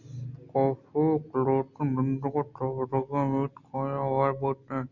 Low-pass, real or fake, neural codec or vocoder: 7.2 kHz; real; none